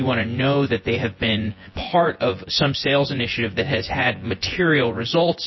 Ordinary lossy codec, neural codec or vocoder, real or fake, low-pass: MP3, 24 kbps; vocoder, 24 kHz, 100 mel bands, Vocos; fake; 7.2 kHz